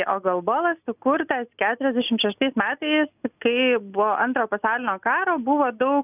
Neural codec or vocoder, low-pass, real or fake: none; 3.6 kHz; real